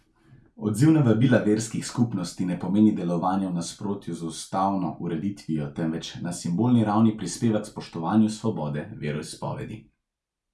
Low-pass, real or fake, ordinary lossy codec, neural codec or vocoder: none; real; none; none